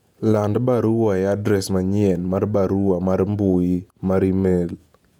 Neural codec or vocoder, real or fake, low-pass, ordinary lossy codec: vocoder, 48 kHz, 128 mel bands, Vocos; fake; 19.8 kHz; none